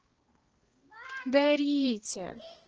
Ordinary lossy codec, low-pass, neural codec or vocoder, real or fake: Opus, 16 kbps; 7.2 kHz; codec, 16 kHz, 2 kbps, X-Codec, HuBERT features, trained on balanced general audio; fake